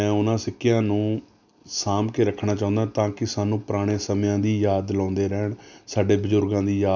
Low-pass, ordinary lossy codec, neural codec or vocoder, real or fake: 7.2 kHz; none; none; real